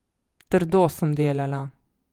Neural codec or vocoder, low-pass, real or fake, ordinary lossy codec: vocoder, 48 kHz, 128 mel bands, Vocos; 19.8 kHz; fake; Opus, 32 kbps